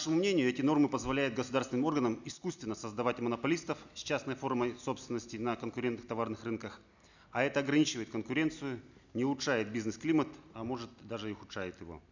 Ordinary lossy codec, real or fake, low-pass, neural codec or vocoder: none; real; 7.2 kHz; none